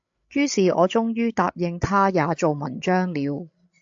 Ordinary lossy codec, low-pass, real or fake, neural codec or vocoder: AAC, 64 kbps; 7.2 kHz; fake; codec, 16 kHz, 16 kbps, FreqCodec, larger model